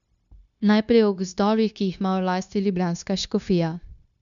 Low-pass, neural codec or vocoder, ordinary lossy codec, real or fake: 7.2 kHz; codec, 16 kHz, 0.9 kbps, LongCat-Audio-Codec; none; fake